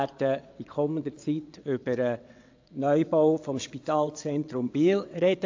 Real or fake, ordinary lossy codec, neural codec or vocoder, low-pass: fake; AAC, 48 kbps; vocoder, 22.05 kHz, 80 mel bands, WaveNeXt; 7.2 kHz